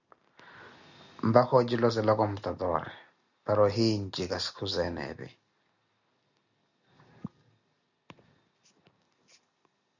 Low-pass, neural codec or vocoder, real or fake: 7.2 kHz; none; real